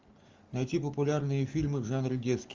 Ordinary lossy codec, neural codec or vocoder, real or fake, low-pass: Opus, 32 kbps; none; real; 7.2 kHz